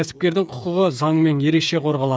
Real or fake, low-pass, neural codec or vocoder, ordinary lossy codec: fake; none; codec, 16 kHz, 8 kbps, FreqCodec, smaller model; none